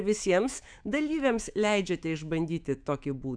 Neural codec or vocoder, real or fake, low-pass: autoencoder, 48 kHz, 128 numbers a frame, DAC-VAE, trained on Japanese speech; fake; 9.9 kHz